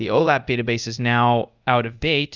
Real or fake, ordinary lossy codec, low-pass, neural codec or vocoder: fake; Opus, 64 kbps; 7.2 kHz; codec, 24 kHz, 0.5 kbps, DualCodec